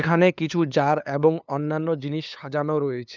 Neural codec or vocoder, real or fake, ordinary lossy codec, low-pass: codec, 16 kHz, 2 kbps, FunCodec, trained on LibriTTS, 25 frames a second; fake; none; 7.2 kHz